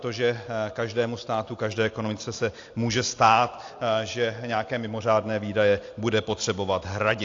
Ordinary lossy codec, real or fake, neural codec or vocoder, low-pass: AAC, 48 kbps; real; none; 7.2 kHz